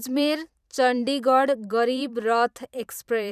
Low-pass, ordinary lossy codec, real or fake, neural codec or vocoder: 14.4 kHz; none; fake; vocoder, 44.1 kHz, 128 mel bands every 512 samples, BigVGAN v2